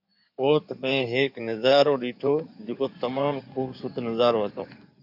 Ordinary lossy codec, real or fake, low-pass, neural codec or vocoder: MP3, 48 kbps; fake; 5.4 kHz; codec, 16 kHz in and 24 kHz out, 2.2 kbps, FireRedTTS-2 codec